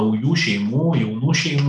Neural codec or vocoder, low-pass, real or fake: none; 10.8 kHz; real